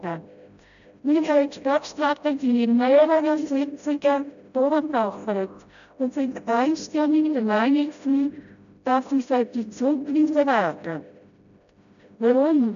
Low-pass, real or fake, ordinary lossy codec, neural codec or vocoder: 7.2 kHz; fake; none; codec, 16 kHz, 0.5 kbps, FreqCodec, smaller model